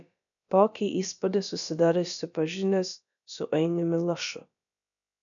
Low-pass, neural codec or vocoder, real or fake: 7.2 kHz; codec, 16 kHz, about 1 kbps, DyCAST, with the encoder's durations; fake